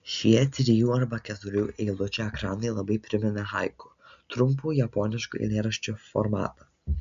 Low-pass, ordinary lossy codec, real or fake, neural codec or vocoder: 7.2 kHz; MP3, 64 kbps; real; none